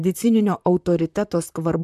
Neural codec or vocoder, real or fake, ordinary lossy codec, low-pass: vocoder, 44.1 kHz, 128 mel bands, Pupu-Vocoder; fake; MP3, 96 kbps; 14.4 kHz